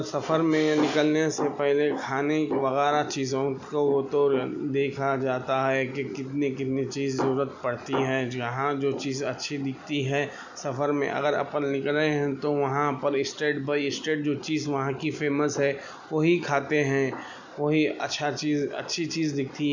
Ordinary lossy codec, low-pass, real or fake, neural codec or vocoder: none; 7.2 kHz; real; none